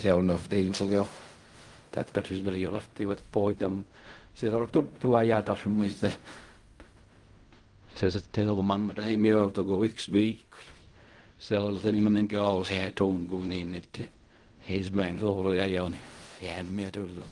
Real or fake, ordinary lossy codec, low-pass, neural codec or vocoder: fake; Opus, 32 kbps; 10.8 kHz; codec, 16 kHz in and 24 kHz out, 0.4 kbps, LongCat-Audio-Codec, fine tuned four codebook decoder